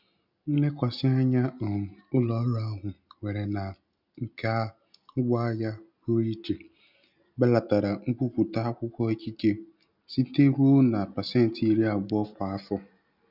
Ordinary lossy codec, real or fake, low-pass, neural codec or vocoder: none; real; 5.4 kHz; none